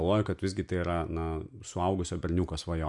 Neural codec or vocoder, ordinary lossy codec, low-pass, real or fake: none; MP3, 64 kbps; 10.8 kHz; real